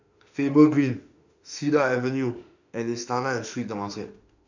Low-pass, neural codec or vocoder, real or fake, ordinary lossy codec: 7.2 kHz; autoencoder, 48 kHz, 32 numbers a frame, DAC-VAE, trained on Japanese speech; fake; none